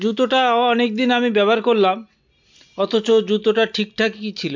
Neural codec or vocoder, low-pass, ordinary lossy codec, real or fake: none; 7.2 kHz; MP3, 64 kbps; real